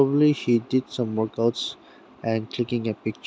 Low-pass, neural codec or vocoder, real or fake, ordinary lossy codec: none; none; real; none